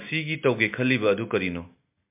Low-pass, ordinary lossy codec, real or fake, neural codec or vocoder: 3.6 kHz; MP3, 32 kbps; real; none